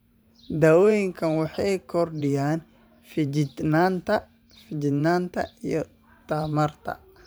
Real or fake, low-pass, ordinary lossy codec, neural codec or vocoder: fake; none; none; vocoder, 44.1 kHz, 128 mel bands every 512 samples, BigVGAN v2